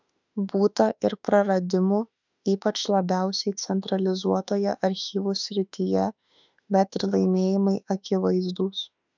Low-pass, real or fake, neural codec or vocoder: 7.2 kHz; fake; autoencoder, 48 kHz, 32 numbers a frame, DAC-VAE, trained on Japanese speech